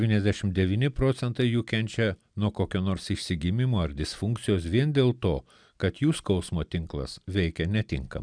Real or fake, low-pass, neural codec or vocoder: real; 9.9 kHz; none